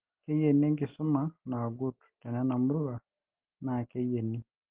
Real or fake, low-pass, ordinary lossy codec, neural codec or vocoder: real; 3.6 kHz; Opus, 16 kbps; none